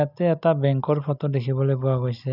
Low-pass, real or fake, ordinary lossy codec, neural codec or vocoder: 5.4 kHz; real; none; none